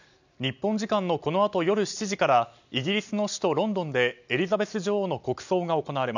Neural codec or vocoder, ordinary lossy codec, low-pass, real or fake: none; none; 7.2 kHz; real